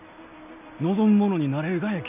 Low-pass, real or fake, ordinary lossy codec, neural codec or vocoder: 3.6 kHz; real; none; none